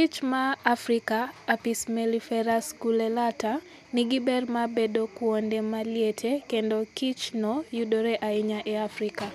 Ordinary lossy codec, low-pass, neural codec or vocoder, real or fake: none; 14.4 kHz; none; real